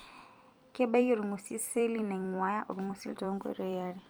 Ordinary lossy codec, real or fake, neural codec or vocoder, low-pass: none; fake; vocoder, 44.1 kHz, 128 mel bands every 256 samples, BigVGAN v2; none